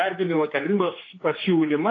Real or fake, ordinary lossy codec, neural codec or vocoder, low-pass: fake; AAC, 32 kbps; codec, 16 kHz, 4 kbps, X-Codec, HuBERT features, trained on general audio; 7.2 kHz